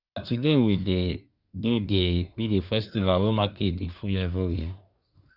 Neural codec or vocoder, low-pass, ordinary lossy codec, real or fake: codec, 24 kHz, 1 kbps, SNAC; 5.4 kHz; Opus, 64 kbps; fake